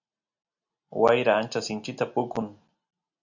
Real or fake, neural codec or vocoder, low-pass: real; none; 7.2 kHz